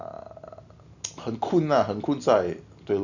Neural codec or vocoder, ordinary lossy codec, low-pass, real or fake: none; none; 7.2 kHz; real